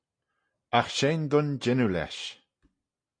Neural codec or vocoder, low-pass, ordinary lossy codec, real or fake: none; 9.9 kHz; MP3, 48 kbps; real